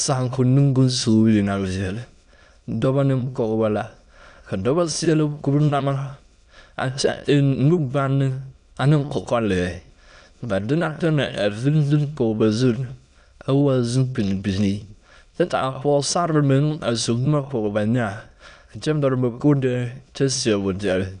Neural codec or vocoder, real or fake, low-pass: autoencoder, 22.05 kHz, a latent of 192 numbers a frame, VITS, trained on many speakers; fake; 9.9 kHz